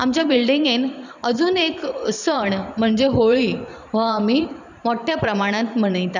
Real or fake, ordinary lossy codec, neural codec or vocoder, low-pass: fake; none; vocoder, 44.1 kHz, 128 mel bands every 512 samples, BigVGAN v2; 7.2 kHz